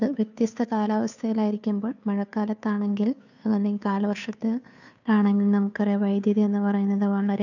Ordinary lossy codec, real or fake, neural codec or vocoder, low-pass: none; fake; codec, 16 kHz, 2 kbps, FunCodec, trained on Chinese and English, 25 frames a second; 7.2 kHz